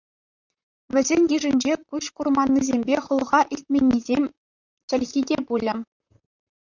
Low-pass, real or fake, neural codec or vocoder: 7.2 kHz; fake; vocoder, 44.1 kHz, 128 mel bands, Pupu-Vocoder